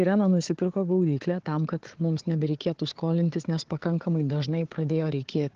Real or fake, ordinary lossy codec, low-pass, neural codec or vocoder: fake; Opus, 16 kbps; 7.2 kHz; codec, 16 kHz, 4 kbps, FunCodec, trained on Chinese and English, 50 frames a second